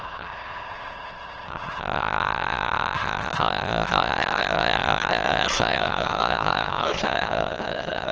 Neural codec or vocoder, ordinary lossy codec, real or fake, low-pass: autoencoder, 22.05 kHz, a latent of 192 numbers a frame, VITS, trained on many speakers; Opus, 16 kbps; fake; 7.2 kHz